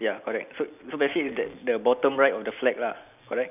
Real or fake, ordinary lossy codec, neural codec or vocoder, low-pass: real; none; none; 3.6 kHz